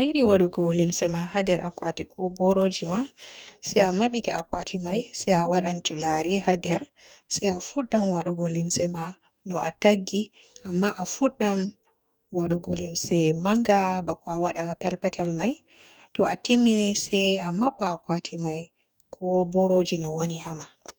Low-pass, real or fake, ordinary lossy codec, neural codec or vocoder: none; fake; none; codec, 44.1 kHz, 2.6 kbps, DAC